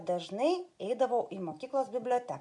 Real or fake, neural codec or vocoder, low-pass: real; none; 10.8 kHz